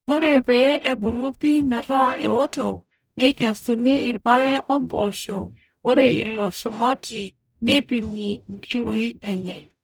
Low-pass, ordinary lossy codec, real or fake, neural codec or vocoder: none; none; fake; codec, 44.1 kHz, 0.9 kbps, DAC